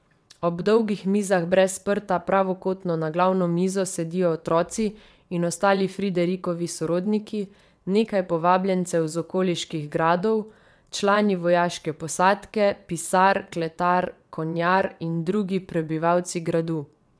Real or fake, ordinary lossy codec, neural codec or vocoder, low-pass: fake; none; vocoder, 22.05 kHz, 80 mel bands, WaveNeXt; none